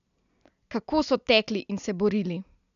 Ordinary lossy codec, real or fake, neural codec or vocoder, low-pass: none; real; none; 7.2 kHz